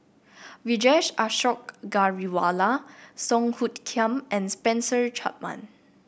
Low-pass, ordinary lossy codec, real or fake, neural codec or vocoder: none; none; real; none